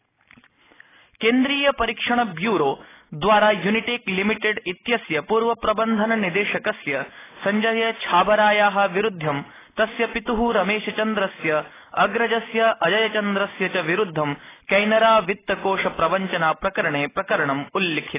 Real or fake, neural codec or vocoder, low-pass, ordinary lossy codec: real; none; 3.6 kHz; AAC, 16 kbps